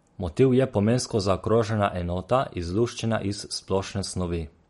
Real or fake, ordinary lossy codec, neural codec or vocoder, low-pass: real; MP3, 48 kbps; none; 19.8 kHz